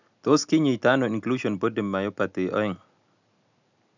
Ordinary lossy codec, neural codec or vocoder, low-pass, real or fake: none; none; 7.2 kHz; real